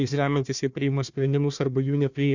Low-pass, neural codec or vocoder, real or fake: 7.2 kHz; codec, 16 kHz, 1 kbps, FunCodec, trained on Chinese and English, 50 frames a second; fake